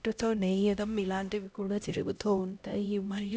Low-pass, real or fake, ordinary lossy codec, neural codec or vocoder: none; fake; none; codec, 16 kHz, 0.5 kbps, X-Codec, HuBERT features, trained on LibriSpeech